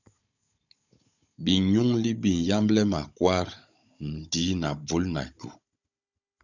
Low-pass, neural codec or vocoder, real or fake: 7.2 kHz; codec, 16 kHz, 16 kbps, FunCodec, trained on Chinese and English, 50 frames a second; fake